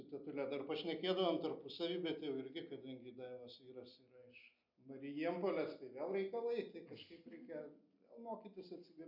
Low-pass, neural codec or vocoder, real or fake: 5.4 kHz; none; real